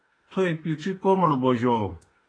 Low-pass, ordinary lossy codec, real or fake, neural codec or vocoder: 9.9 kHz; AAC, 32 kbps; fake; autoencoder, 48 kHz, 32 numbers a frame, DAC-VAE, trained on Japanese speech